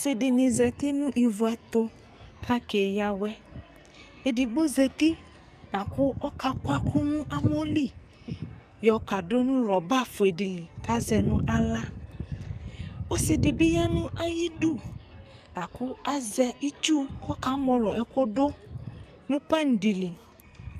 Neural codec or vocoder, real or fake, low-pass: codec, 44.1 kHz, 2.6 kbps, SNAC; fake; 14.4 kHz